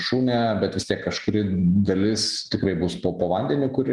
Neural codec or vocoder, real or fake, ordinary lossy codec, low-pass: none; real; Opus, 24 kbps; 10.8 kHz